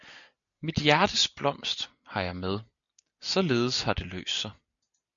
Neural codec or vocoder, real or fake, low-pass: none; real; 7.2 kHz